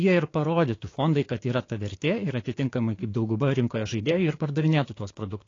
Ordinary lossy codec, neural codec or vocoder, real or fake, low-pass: AAC, 32 kbps; codec, 16 kHz, 6 kbps, DAC; fake; 7.2 kHz